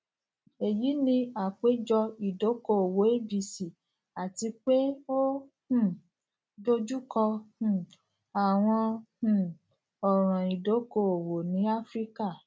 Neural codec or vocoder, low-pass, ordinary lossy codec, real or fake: none; none; none; real